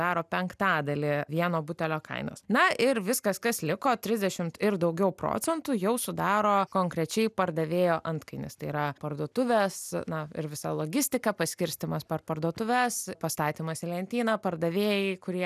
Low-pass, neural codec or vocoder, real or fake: 14.4 kHz; none; real